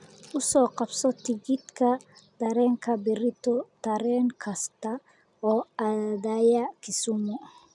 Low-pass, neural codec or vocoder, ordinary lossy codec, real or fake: 10.8 kHz; none; none; real